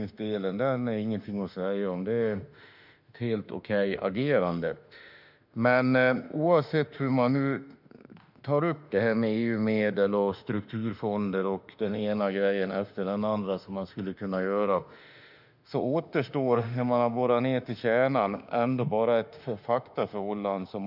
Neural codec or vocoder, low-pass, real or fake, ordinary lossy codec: autoencoder, 48 kHz, 32 numbers a frame, DAC-VAE, trained on Japanese speech; 5.4 kHz; fake; none